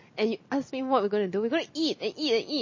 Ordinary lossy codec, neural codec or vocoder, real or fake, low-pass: MP3, 32 kbps; none; real; 7.2 kHz